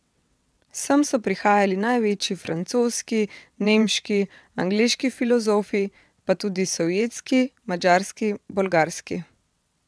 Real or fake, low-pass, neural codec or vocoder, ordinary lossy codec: fake; none; vocoder, 22.05 kHz, 80 mel bands, WaveNeXt; none